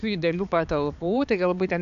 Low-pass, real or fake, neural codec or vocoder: 7.2 kHz; fake; codec, 16 kHz, 4 kbps, X-Codec, HuBERT features, trained on balanced general audio